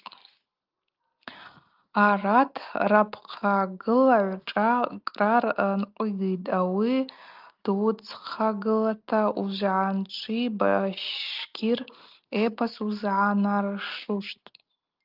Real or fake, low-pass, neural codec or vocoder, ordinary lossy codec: real; 5.4 kHz; none; Opus, 24 kbps